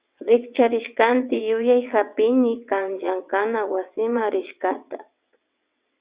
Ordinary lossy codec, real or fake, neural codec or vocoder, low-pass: Opus, 64 kbps; fake; vocoder, 22.05 kHz, 80 mel bands, WaveNeXt; 3.6 kHz